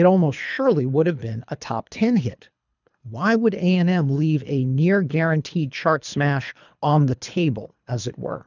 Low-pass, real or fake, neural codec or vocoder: 7.2 kHz; fake; codec, 24 kHz, 3 kbps, HILCodec